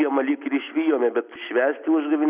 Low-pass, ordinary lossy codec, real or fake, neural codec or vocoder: 3.6 kHz; Opus, 64 kbps; real; none